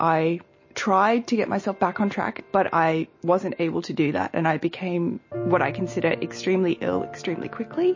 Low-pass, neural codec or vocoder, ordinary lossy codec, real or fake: 7.2 kHz; none; MP3, 32 kbps; real